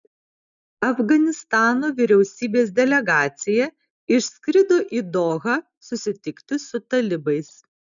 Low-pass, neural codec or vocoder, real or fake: 7.2 kHz; none; real